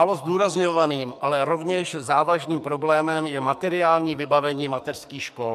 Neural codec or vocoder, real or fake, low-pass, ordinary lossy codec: codec, 44.1 kHz, 2.6 kbps, SNAC; fake; 14.4 kHz; MP3, 96 kbps